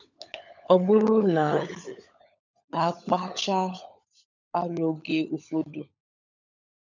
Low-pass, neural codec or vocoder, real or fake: 7.2 kHz; codec, 16 kHz, 16 kbps, FunCodec, trained on LibriTTS, 50 frames a second; fake